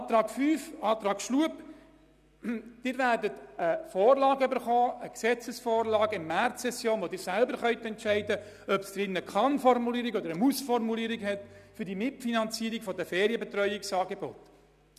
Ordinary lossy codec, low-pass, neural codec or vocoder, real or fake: none; 14.4 kHz; none; real